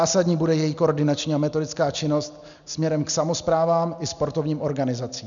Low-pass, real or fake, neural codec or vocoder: 7.2 kHz; real; none